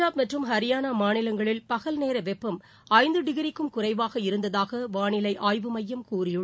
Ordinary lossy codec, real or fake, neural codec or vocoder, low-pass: none; real; none; none